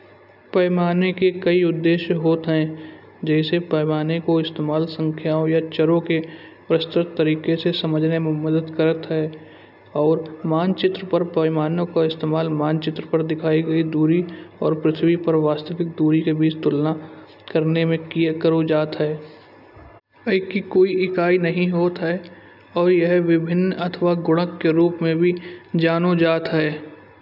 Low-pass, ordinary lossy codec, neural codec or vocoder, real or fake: 5.4 kHz; none; none; real